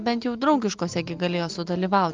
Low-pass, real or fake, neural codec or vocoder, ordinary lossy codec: 7.2 kHz; real; none; Opus, 32 kbps